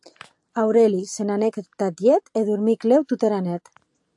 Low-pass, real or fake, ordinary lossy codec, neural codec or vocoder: 10.8 kHz; real; AAC, 64 kbps; none